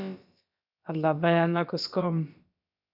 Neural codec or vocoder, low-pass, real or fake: codec, 16 kHz, about 1 kbps, DyCAST, with the encoder's durations; 5.4 kHz; fake